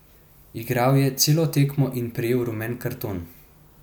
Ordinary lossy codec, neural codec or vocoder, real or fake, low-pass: none; none; real; none